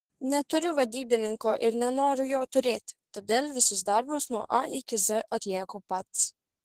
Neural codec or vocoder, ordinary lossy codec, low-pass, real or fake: codec, 32 kHz, 1.9 kbps, SNAC; Opus, 16 kbps; 14.4 kHz; fake